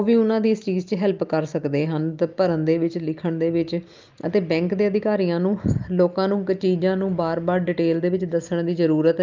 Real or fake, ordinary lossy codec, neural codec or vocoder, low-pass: real; Opus, 24 kbps; none; 7.2 kHz